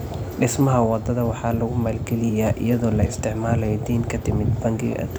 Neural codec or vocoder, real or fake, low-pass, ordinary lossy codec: none; real; none; none